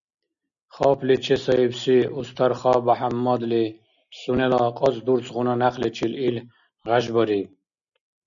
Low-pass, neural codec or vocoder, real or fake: 7.2 kHz; none; real